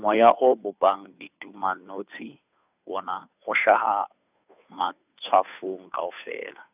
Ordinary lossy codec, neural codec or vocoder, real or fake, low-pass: none; codec, 16 kHz, 2 kbps, FunCodec, trained on Chinese and English, 25 frames a second; fake; 3.6 kHz